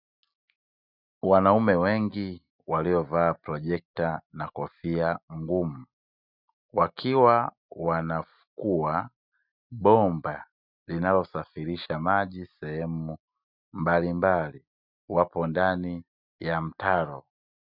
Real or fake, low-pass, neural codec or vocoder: real; 5.4 kHz; none